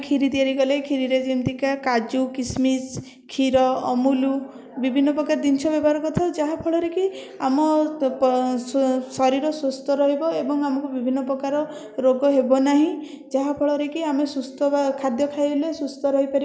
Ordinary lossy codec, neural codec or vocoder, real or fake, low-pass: none; none; real; none